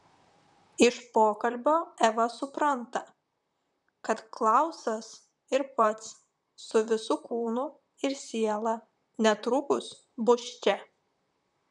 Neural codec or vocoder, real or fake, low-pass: vocoder, 44.1 kHz, 128 mel bands, Pupu-Vocoder; fake; 10.8 kHz